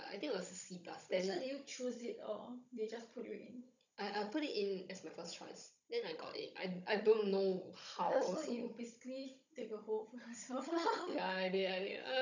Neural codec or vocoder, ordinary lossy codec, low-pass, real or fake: codec, 16 kHz, 16 kbps, FunCodec, trained on Chinese and English, 50 frames a second; none; 7.2 kHz; fake